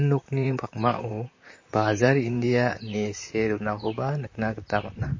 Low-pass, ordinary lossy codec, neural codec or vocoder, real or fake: 7.2 kHz; MP3, 32 kbps; vocoder, 44.1 kHz, 128 mel bands, Pupu-Vocoder; fake